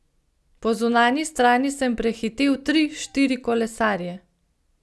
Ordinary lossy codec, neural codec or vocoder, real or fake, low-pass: none; none; real; none